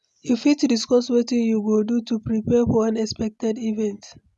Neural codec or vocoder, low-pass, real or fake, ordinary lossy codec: none; none; real; none